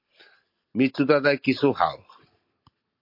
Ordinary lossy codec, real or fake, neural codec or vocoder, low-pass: MP3, 32 kbps; real; none; 5.4 kHz